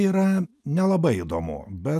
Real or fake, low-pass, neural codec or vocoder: real; 14.4 kHz; none